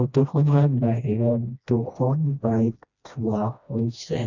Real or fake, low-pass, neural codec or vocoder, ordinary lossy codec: fake; 7.2 kHz; codec, 16 kHz, 1 kbps, FreqCodec, smaller model; none